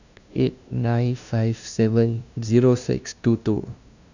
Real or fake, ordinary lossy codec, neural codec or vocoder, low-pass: fake; none; codec, 16 kHz, 0.5 kbps, FunCodec, trained on LibriTTS, 25 frames a second; 7.2 kHz